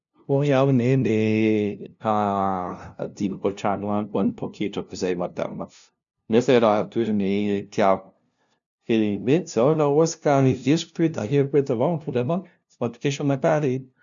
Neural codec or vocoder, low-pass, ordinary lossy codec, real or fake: codec, 16 kHz, 0.5 kbps, FunCodec, trained on LibriTTS, 25 frames a second; 7.2 kHz; none; fake